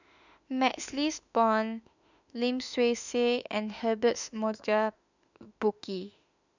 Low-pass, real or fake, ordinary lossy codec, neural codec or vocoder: 7.2 kHz; fake; none; autoencoder, 48 kHz, 32 numbers a frame, DAC-VAE, trained on Japanese speech